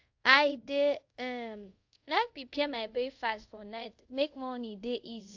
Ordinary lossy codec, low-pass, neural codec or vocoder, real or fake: AAC, 48 kbps; 7.2 kHz; codec, 24 kHz, 0.5 kbps, DualCodec; fake